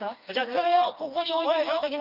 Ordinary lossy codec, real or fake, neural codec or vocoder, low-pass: none; fake; codec, 16 kHz, 2 kbps, FreqCodec, smaller model; 5.4 kHz